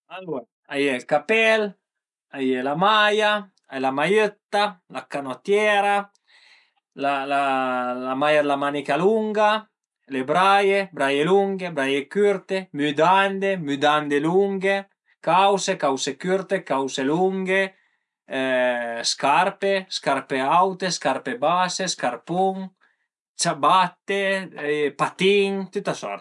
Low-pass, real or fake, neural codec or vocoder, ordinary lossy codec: 10.8 kHz; real; none; none